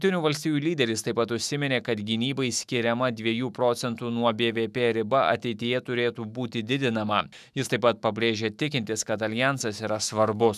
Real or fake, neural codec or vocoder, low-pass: fake; autoencoder, 48 kHz, 128 numbers a frame, DAC-VAE, trained on Japanese speech; 14.4 kHz